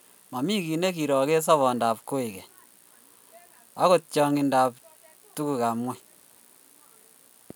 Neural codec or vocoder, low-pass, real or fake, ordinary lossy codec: none; none; real; none